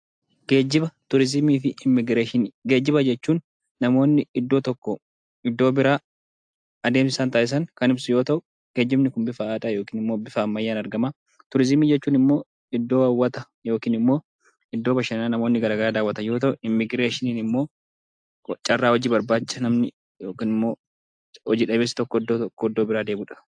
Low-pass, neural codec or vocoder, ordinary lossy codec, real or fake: 9.9 kHz; none; AAC, 64 kbps; real